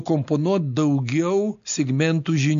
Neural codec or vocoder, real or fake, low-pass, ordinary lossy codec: none; real; 7.2 kHz; MP3, 48 kbps